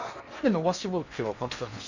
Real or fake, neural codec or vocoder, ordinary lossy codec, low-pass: fake; codec, 16 kHz in and 24 kHz out, 0.8 kbps, FocalCodec, streaming, 65536 codes; none; 7.2 kHz